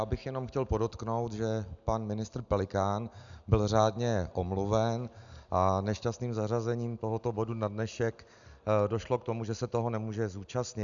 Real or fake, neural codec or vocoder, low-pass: real; none; 7.2 kHz